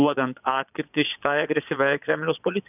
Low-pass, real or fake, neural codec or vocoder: 3.6 kHz; fake; vocoder, 22.05 kHz, 80 mel bands, Vocos